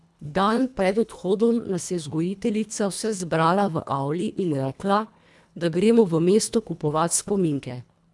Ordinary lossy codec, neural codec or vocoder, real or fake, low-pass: none; codec, 24 kHz, 1.5 kbps, HILCodec; fake; none